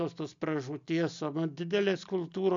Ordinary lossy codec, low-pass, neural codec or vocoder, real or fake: MP3, 64 kbps; 7.2 kHz; none; real